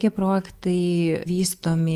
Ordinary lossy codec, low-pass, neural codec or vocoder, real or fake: Opus, 32 kbps; 14.4 kHz; autoencoder, 48 kHz, 128 numbers a frame, DAC-VAE, trained on Japanese speech; fake